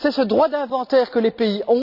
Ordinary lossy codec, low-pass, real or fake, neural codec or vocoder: none; 5.4 kHz; real; none